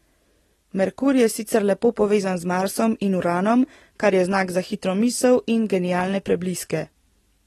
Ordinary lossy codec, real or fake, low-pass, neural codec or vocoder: AAC, 32 kbps; real; 19.8 kHz; none